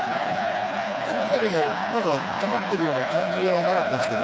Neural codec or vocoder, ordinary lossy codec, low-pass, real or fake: codec, 16 kHz, 2 kbps, FreqCodec, smaller model; none; none; fake